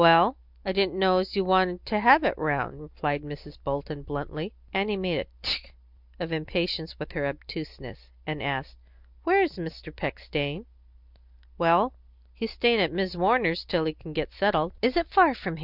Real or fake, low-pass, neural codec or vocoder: real; 5.4 kHz; none